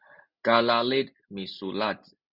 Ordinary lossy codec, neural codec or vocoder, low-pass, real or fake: Opus, 64 kbps; none; 5.4 kHz; real